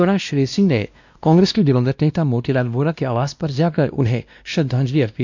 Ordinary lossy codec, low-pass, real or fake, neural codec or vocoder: none; 7.2 kHz; fake; codec, 16 kHz, 1 kbps, X-Codec, WavLM features, trained on Multilingual LibriSpeech